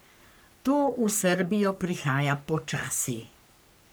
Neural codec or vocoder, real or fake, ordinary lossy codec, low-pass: codec, 44.1 kHz, 3.4 kbps, Pupu-Codec; fake; none; none